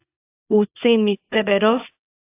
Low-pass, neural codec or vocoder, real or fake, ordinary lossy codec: 3.6 kHz; codec, 24 kHz, 0.9 kbps, WavTokenizer, small release; fake; AAC, 24 kbps